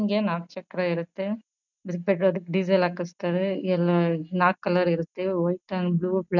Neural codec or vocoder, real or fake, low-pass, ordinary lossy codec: none; real; 7.2 kHz; none